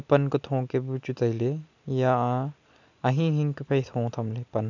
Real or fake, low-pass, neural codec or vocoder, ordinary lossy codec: real; 7.2 kHz; none; none